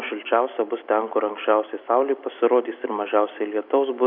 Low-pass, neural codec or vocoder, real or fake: 5.4 kHz; none; real